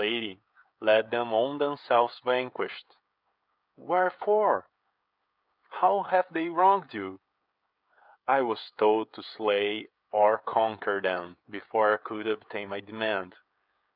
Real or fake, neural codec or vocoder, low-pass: fake; codec, 16 kHz, 16 kbps, FreqCodec, smaller model; 5.4 kHz